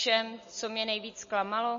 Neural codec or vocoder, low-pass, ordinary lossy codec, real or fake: none; 7.2 kHz; MP3, 32 kbps; real